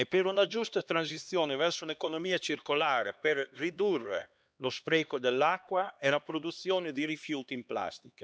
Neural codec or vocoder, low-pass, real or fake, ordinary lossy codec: codec, 16 kHz, 2 kbps, X-Codec, HuBERT features, trained on LibriSpeech; none; fake; none